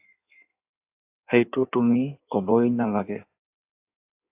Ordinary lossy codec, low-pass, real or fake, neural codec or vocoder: AAC, 32 kbps; 3.6 kHz; fake; codec, 16 kHz in and 24 kHz out, 1.1 kbps, FireRedTTS-2 codec